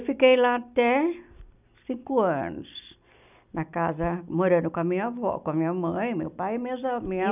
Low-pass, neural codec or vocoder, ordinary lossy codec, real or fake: 3.6 kHz; none; none; real